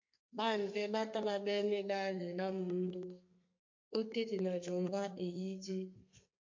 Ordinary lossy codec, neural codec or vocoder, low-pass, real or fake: MP3, 48 kbps; codec, 32 kHz, 1.9 kbps, SNAC; 7.2 kHz; fake